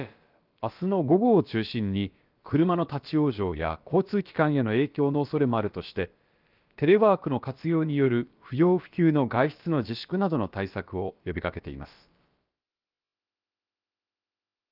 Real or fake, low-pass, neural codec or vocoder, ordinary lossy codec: fake; 5.4 kHz; codec, 16 kHz, about 1 kbps, DyCAST, with the encoder's durations; Opus, 24 kbps